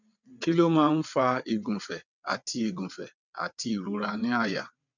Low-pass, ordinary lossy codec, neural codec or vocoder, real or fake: 7.2 kHz; none; vocoder, 22.05 kHz, 80 mel bands, WaveNeXt; fake